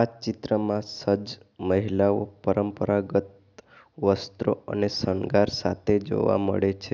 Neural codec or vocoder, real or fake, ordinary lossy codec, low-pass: none; real; none; 7.2 kHz